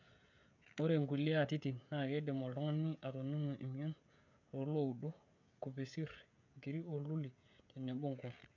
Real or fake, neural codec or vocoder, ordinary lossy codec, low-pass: fake; codec, 16 kHz, 16 kbps, FreqCodec, smaller model; none; 7.2 kHz